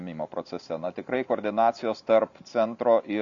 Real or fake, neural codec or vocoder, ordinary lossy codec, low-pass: real; none; MP3, 64 kbps; 7.2 kHz